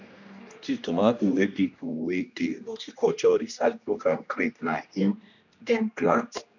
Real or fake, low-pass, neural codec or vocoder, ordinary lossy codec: fake; 7.2 kHz; codec, 16 kHz, 1 kbps, X-Codec, HuBERT features, trained on general audio; none